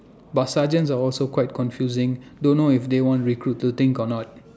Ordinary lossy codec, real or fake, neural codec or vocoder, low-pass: none; real; none; none